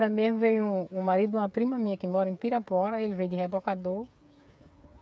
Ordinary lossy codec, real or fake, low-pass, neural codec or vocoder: none; fake; none; codec, 16 kHz, 8 kbps, FreqCodec, smaller model